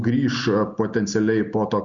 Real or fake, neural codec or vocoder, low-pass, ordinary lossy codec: real; none; 7.2 kHz; Opus, 64 kbps